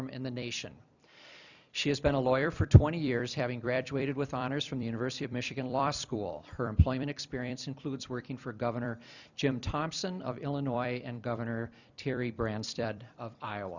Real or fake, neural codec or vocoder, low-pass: fake; vocoder, 44.1 kHz, 128 mel bands every 256 samples, BigVGAN v2; 7.2 kHz